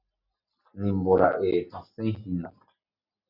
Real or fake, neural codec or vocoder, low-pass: real; none; 5.4 kHz